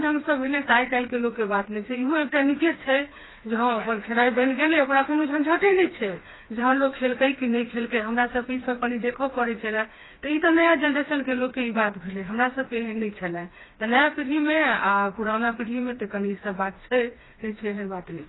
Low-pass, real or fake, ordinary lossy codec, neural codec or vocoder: 7.2 kHz; fake; AAC, 16 kbps; codec, 16 kHz, 2 kbps, FreqCodec, smaller model